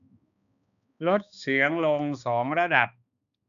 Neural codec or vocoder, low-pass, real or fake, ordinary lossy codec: codec, 16 kHz, 2 kbps, X-Codec, HuBERT features, trained on balanced general audio; 7.2 kHz; fake; none